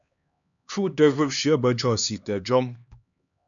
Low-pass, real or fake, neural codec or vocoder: 7.2 kHz; fake; codec, 16 kHz, 2 kbps, X-Codec, HuBERT features, trained on LibriSpeech